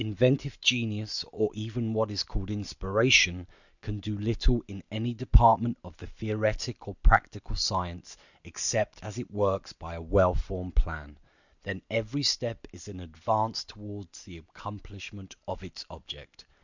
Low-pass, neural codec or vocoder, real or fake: 7.2 kHz; none; real